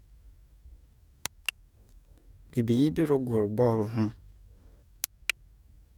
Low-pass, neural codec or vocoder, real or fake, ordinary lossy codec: 19.8 kHz; codec, 44.1 kHz, 2.6 kbps, DAC; fake; none